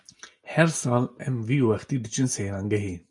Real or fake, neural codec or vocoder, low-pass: real; none; 10.8 kHz